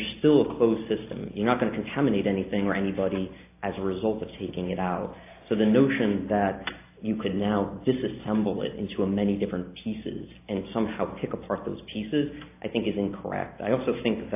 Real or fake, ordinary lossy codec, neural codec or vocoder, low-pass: real; AAC, 24 kbps; none; 3.6 kHz